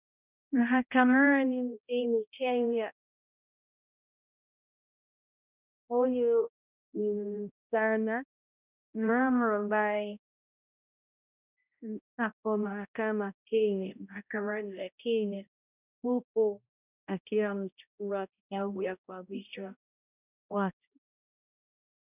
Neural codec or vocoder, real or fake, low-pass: codec, 16 kHz, 0.5 kbps, X-Codec, HuBERT features, trained on balanced general audio; fake; 3.6 kHz